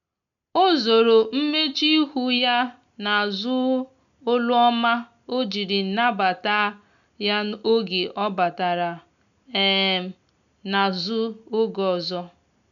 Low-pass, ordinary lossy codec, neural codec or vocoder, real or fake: 7.2 kHz; none; none; real